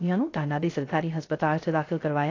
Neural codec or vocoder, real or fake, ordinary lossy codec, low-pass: codec, 16 kHz, 0.3 kbps, FocalCodec; fake; AAC, 32 kbps; 7.2 kHz